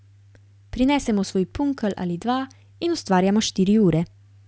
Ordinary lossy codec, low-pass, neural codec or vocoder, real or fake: none; none; none; real